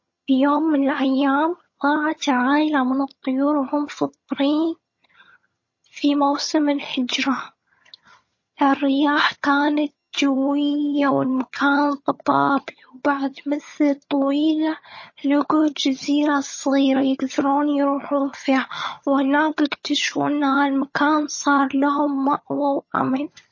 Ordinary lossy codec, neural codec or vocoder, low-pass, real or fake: MP3, 32 kbps; vocoder, 22.05 kHz, 80 mel bands, HiFi-GAN; 7.2 kHz; fake